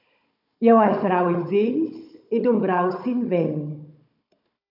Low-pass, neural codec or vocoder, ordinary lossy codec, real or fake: 5.4 kHz; codec, 16 kHz, 16 kbps, FunCodec, trained on Chinese and English, 50 frames a second; MP3, 48 kbps; fake